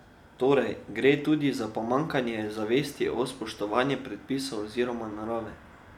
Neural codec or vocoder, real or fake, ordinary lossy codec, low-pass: none; real; none; 19.8 kHz